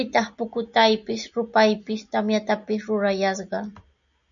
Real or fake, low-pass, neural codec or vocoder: real; 7.2 kHz; none